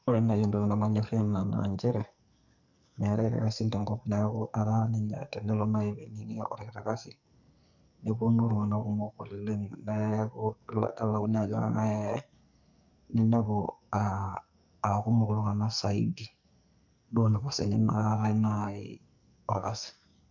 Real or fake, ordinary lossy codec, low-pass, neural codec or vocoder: fake; none; 7.2 kHz; codec, 44.1 kHz, 2.6 kbps, SNAC